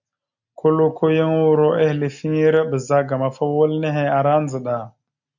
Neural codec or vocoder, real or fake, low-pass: none; real; 7.2 kHz